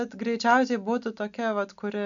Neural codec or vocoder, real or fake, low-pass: none; real; 7.2 kHz